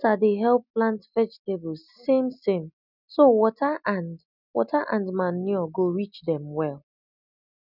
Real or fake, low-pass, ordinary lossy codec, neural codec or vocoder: real; 5.4 kHz; none; none